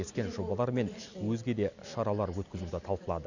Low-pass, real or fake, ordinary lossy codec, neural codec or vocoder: 7.2 kHz; real; none; none